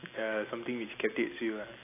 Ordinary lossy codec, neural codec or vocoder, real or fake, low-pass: AAC, 24 kbps; none; real; 3.6 kHz